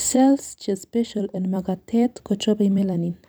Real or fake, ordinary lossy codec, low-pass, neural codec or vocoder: fake; none; none; vocoder, 44.1 kHz, 128 mel bands every 256 samples, BigVGAN v2